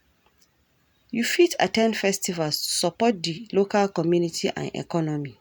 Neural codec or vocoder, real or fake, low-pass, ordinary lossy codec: none; real; none; none